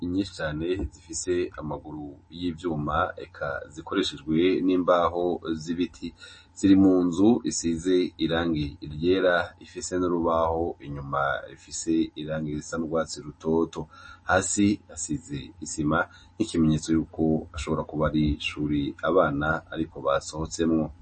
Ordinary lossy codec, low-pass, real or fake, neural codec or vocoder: MP3, 32 kbps; 10.8 kHz; real; none